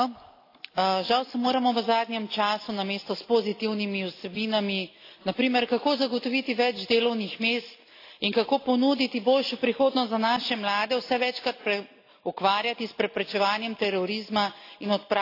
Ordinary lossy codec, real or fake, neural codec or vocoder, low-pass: AAC, 32 kbps; real; none; 5.4 kHz